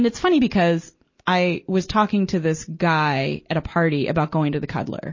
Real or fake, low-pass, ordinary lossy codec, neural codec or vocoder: real; 7.2 kHz; MP3, 32 kbps; none